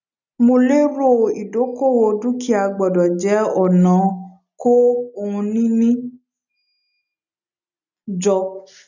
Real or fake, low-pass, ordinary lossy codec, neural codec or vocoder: real; 7.2 kHz; none; none